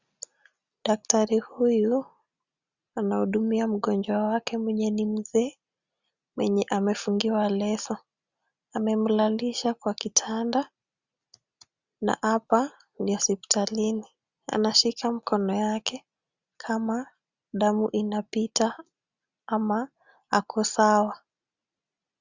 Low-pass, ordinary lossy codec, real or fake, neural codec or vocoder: 7.2 kHz; Opus, 64 kbps; real; none